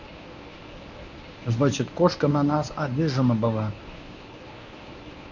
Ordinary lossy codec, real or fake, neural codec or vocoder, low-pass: none; fake; codec, 24 kHz, 0.9 kbps, WavTokenizer, medium speech release version 1; 7.2 kHz